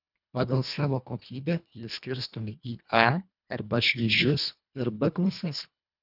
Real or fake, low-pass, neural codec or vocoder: fake; 5.4 kHz; codec, 24 kHz, 1.5 kbps, HILCodec